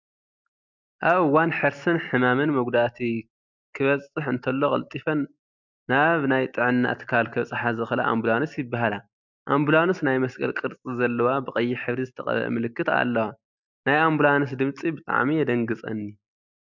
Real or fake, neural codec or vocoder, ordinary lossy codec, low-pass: real; none; MP3, 64 kbps; 7.2 kHz